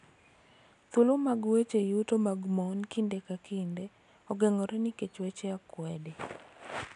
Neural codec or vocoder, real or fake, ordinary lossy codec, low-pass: none; real; none; 10.8 kHz